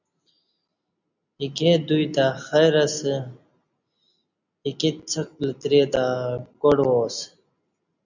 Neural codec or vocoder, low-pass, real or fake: none; 7.2 kHz; real